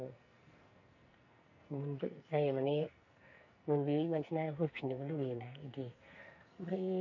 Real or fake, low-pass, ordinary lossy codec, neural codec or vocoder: fake; 7.2 kHz; none; codec, 44.1 kHz, 2.6 kbps, SNAC